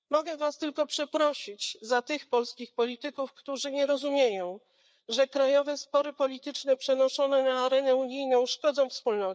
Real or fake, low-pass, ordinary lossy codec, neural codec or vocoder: fake; none; none; codec, 16 kHz, 4 kbps, FreqCodec, larger model